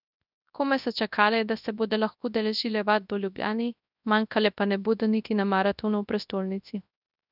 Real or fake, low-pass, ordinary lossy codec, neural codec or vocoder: fake; 5.4 kHz; none; codec, 24 kHz, 0.9 kbps, WavTokenizer, large speech release